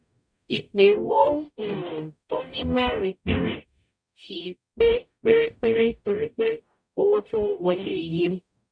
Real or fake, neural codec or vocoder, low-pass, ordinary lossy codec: fake; codec, 44.1 kHz, 0.9 kbps, DAC; 9.9 kHz; none